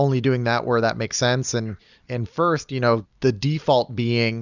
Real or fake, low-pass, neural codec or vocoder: real; 7.2 kHz; none